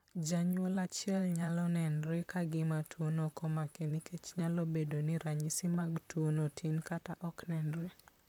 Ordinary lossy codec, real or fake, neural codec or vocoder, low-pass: none; fake; vocoder, 44.1 kHz, 128 mel bands, Pupu-Vocoder; 19.8 kHz